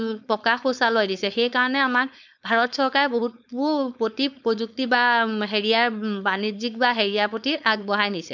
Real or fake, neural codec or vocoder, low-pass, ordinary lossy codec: fake; codec, 16 kHz, 4.8 kbps, FACodec; 7.2 kHz; none